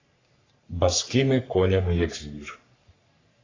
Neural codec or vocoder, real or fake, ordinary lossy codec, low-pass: codec, 44.1 kHz, 3.4 kbps, Pupu-Codec; fake; AAC, 32 kbps; 7.2 kHz